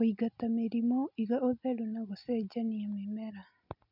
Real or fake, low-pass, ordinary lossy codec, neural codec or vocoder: real; 5.4 kHz; none; none